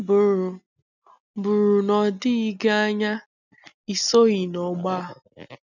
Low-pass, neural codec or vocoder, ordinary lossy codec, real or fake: 7.2 kHz; none; none; real